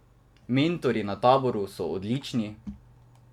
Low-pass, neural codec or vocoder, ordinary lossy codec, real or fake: 19.8 kHz; none; none; real